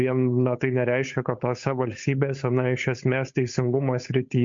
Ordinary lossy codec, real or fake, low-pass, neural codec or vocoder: MP3, 48 kbps; fake; 7.2 kHz; codec, 16 kHz, 8 kbps, FunCodec, trained on Chinese and English, 25 frames a second